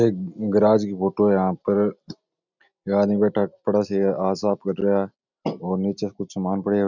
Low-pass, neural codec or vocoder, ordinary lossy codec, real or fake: 7.2 kHz; none; none; real